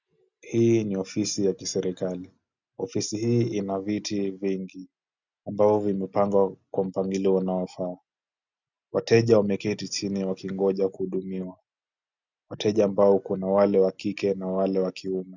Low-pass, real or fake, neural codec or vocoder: 7.2 kHz; real; none